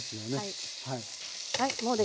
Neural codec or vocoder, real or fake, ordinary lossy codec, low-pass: none; real; none; none